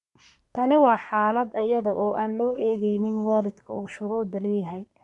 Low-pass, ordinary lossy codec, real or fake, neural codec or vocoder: 10.8 kHz; none; fake; codec, 24 kHz, 1 kbps, SNAC